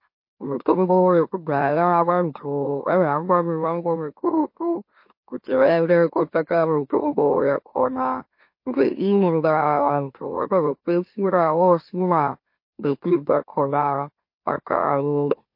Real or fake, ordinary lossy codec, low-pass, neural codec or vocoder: fake; MP3, 32 kbps; 5.4 kHz; autoencoder, 44.1 kHz, a latent of 192 numbers a frame, MeloTTS